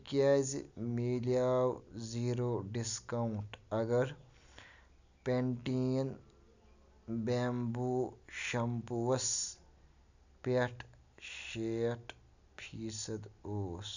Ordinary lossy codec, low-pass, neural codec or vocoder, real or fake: none; 7.2 kHz; none; real